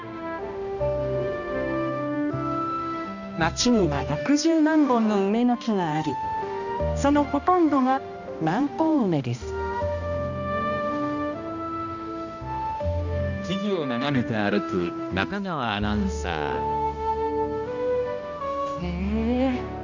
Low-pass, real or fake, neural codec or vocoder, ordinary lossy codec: 7.2 kHz; fake; codec, 16 kHz, 1 kbps, X-Codec, HuBERT features, trained on balanced general audio; none